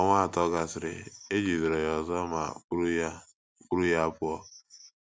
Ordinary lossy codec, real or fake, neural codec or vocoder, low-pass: none; real; none; none